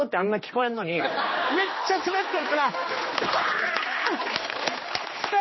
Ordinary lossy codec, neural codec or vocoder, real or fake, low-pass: MP3, 24 kbps; codec, 16 kHz, 2 kbps, X-Codec, HuBERT features, trained on general audio; fake; 7.2 kHz